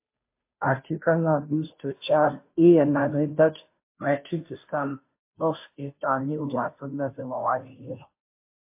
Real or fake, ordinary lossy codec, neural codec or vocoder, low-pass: fake; MP3, 24 kbps; codec, 16 kHz, 0.5 kbps, FunCodec, trained on Chinese and English, 25 frames a second; 3.6 kHz